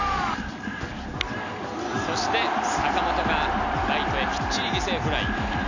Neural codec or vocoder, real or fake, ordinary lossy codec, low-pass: none; real; none; 7.2 kHz